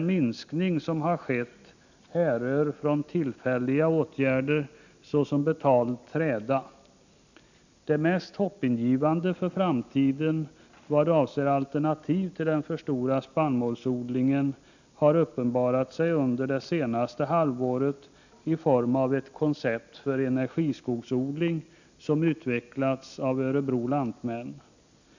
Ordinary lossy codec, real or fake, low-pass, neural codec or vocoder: none; real; 7.2 kHz; none